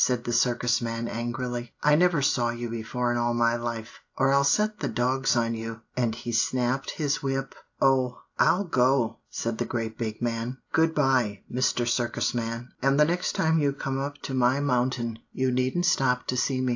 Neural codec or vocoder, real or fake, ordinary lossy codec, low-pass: none; real; AAC, 48 kbps; 7.2 kHz